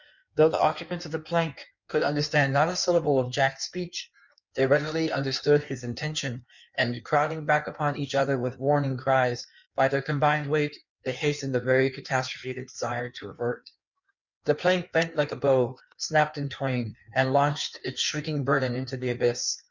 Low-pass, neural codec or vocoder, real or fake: 7.2 kHz; codec, 16 kHz in and 24 kHz out, 1.1 kbps, FireRedTTS-2 codec; fake